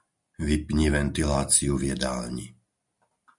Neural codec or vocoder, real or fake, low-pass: none; real; 10.8 kHz